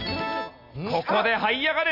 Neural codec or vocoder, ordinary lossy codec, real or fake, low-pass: none; none; real; 5.4 kHz